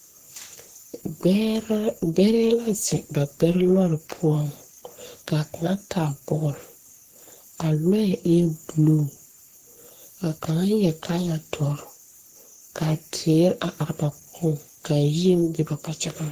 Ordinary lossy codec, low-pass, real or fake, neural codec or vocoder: Opus, 16 kbps; 14.4 kHz; fake; codec, 44.1 kHz, 3.4 kbps, Pupu-Codec